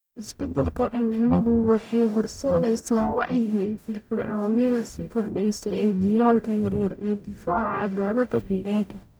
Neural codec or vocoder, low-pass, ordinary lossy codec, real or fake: codec, 44.1 kHz, 0.9 kbps, DAC; none; none; fake